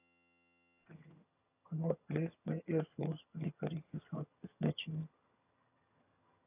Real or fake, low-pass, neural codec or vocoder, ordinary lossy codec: fake; 3.6 kHz; vocoder, 22.05 kHz, 80 mel bands, HiFi-GAN; AAC, 32 kbps